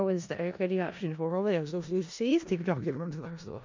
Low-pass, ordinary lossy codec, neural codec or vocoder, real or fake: 7.2 kHz; MP3, 64 kbps; codec, 16 kHz in and 24 kHz out, 0.4 kbps, LongCat-Audio-Codec, four codebook decoder; fake